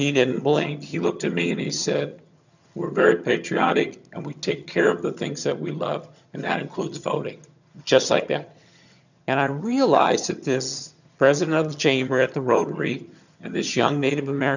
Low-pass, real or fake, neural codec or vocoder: 7.2 kHz; fake; vocoder, 22.05 kHz, 80 mel bands, HiFi-GAN